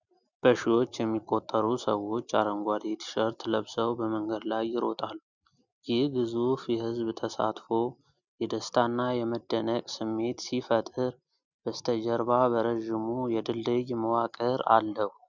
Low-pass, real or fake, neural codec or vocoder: 7.2 kHz; real; none